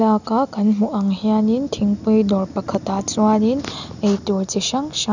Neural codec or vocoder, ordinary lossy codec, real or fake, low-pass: none; none; real; 7.2 kHz